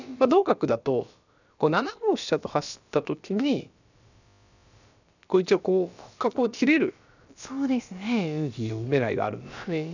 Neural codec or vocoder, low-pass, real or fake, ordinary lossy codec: codec, 16 kHz, about 1 kbps, DyCAST, with the encoder's durations; 7.2 kHz; fake; none